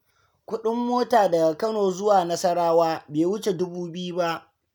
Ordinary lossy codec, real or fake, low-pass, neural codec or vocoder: none; real; none; none